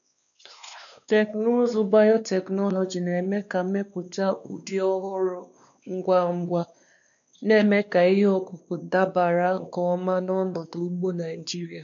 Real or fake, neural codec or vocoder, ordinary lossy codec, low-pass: fake; codec, 16 kHz, 2 kbps, X-Codec, WavLM features, trained on Multilingual LibriSpeech; none; 7.2 kHz